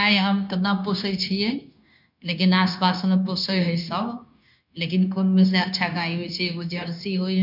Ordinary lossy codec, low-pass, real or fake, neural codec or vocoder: none; 5.4 kHz; fake; codec, 16 kHz, 0.9 kbps, LongCat-Audio-Codec